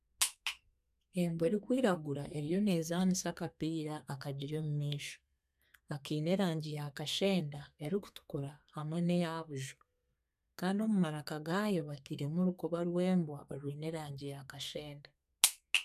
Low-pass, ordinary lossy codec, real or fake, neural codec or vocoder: 14.4 kHz; none; fake; codec, 32 kHz, 1.9 kbps, SNAC